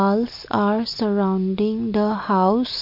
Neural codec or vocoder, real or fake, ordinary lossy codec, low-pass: none; real; MP3, 32 kbps; 5.4 kHz